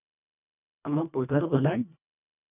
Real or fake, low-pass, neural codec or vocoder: fake; 3.6 kHz; codec, 24 kHz, 1.5 kbps, HILCodec